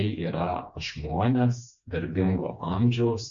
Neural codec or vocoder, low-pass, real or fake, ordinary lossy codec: codec, 16 kHz, 2 kbps, FreqCodec, smaller model; 7.2 kHz; fake; MP3, 48 kbps